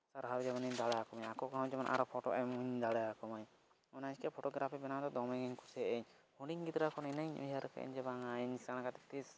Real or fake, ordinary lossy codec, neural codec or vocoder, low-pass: real; none; none; none